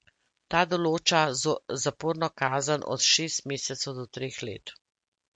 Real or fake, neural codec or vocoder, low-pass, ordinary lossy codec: real; none; 9.9 kHz; MP3, 48 kbps